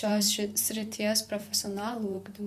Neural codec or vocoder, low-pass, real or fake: vocoder, 44.1 kHz, 128 mel bands, Pupu-Vocoder; 14.4 kHz; fake